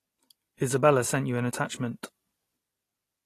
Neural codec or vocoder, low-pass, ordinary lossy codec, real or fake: none; 14.4 kHz; AAC, 48 kbps; real